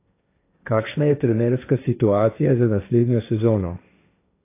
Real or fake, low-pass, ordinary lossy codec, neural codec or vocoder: fake; 3.6 kHz; AAC, 24 kbps; codec, 16 kHz, 1.1 kbps, Voila-Tokenizer